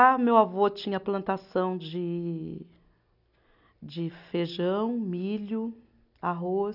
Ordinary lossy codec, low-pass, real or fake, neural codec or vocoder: none; 5.4 kHz; real; none